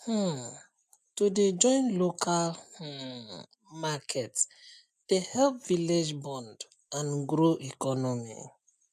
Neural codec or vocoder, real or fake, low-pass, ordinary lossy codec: none; real; 14.4 kHz; AAC, 96 kbps